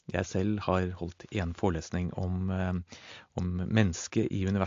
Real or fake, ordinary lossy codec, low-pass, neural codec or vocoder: real; AAC, 48 kbps; 7.2 kHz; none